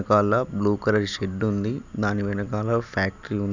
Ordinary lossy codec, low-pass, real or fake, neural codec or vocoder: none; 7.2 kHz; real; none